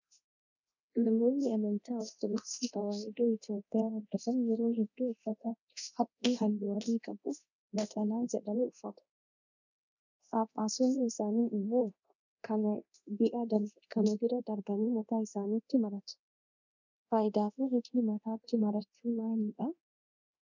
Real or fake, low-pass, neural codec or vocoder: fake; 7.2 kHz; codec, 24 kHz, 0.9 kbps, DualCodec